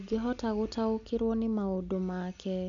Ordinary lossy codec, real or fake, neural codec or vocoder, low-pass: none; real; none; 7.2 kHz